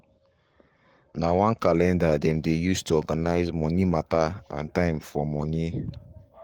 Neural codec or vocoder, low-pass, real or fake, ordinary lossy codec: codec, 44.1 kHz, 7.8 kbps, Pupu-Codec; 19.8 kHz; fake; Opus, 24 kbps